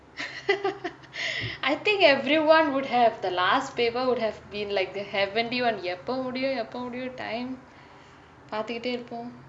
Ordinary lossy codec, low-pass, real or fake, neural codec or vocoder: none; 9.9 kHz; real; none